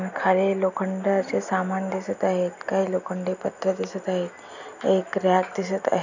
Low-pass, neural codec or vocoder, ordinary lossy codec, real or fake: 7.2 kHz; none; none; real